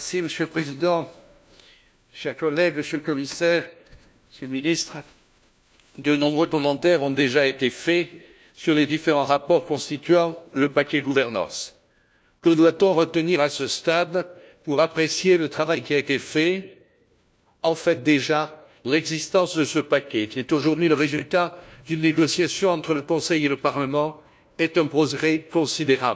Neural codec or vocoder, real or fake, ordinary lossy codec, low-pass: codec, 16 kHz, 1 kbps, FunCodec, trained on LibriTTS, 50 frames a second; fake; none; none